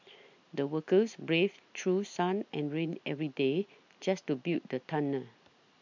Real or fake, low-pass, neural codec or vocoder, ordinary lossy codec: real; 7.2 kHz; none; MP3, 64 kbps